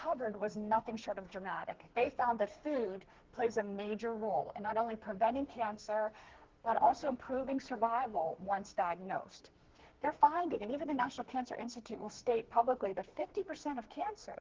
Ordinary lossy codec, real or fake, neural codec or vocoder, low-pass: Opus, 16 kbps; fake; codec, 32 kHz, 1.9 kbps, SNAC; 7.2 kHz